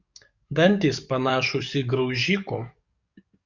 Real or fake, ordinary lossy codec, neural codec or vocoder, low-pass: fake; Opus, 64 kbps; codec, 44.1 kHz, 7.8 kbps, DAC; 7.2 kHz